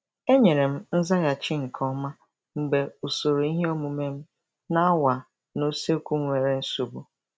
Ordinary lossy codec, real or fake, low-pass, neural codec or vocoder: none; real; none; none